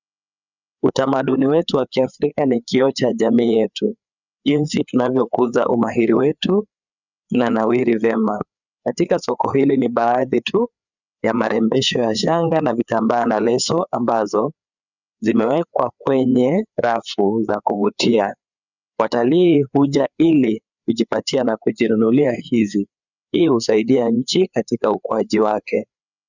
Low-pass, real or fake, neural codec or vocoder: 7.2 kHz; fake; codec, 16 kHz, 4 kbps, FreqCodec, larger model